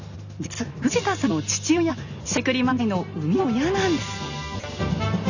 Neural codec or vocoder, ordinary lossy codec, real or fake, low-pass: none; none; real; 7.2 kHz